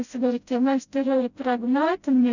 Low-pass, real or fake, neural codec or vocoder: 7.2 kHz; fake; codec, 16 kHz, 0.5 kbps, FreqCodec, smaller model